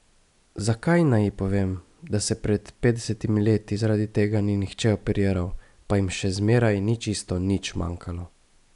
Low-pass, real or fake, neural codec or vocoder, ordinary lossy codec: 10.8 kHz; real; none; none